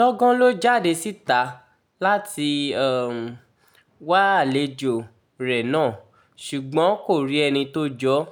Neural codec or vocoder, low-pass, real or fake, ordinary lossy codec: none; 19.8 kHz; real; none